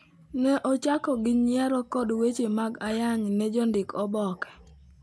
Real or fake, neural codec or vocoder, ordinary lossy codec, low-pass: real; none; none; none